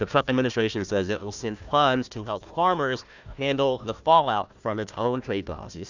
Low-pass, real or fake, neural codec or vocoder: 7.2 kHz; fake; codec, 16 kHz, 1 kbps, FunCodec, trained on Chinese and English, 50 frames a second